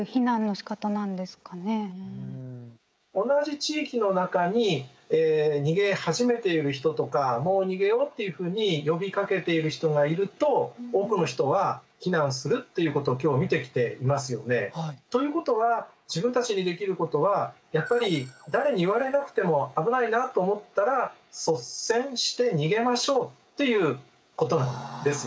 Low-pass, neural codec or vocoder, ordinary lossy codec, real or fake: none; codec, 16 kHz, 16 kbps, FreqCodec, smaller model; none; fake